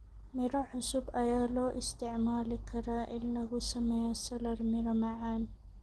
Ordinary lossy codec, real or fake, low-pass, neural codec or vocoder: Opus, 16 kbps; real; 10.8 kHz; none